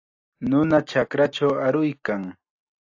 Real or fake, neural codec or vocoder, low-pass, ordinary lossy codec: real; none; 7.2 kHz; AAC, 48 kbps